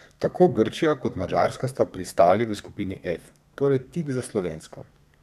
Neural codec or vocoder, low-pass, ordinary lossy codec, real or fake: codec, 32 kHz, 1.9 kbps, SNAC; 14.4 kHz; none; fake